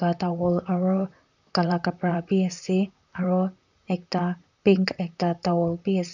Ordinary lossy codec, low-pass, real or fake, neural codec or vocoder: none; 7.2 kHz; fake; vocoder, 44.1 kHz, 128 mel bands, Pupu-Vocoder